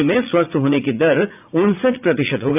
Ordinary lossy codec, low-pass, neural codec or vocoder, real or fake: AAC, 32 kbps; 3.6 kHz; vocoder, 44.1 kHz, 80 mel bands, Vocos; fake